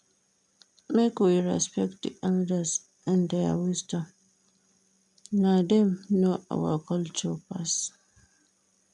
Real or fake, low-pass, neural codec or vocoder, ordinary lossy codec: real; 10.8 kHz; none; none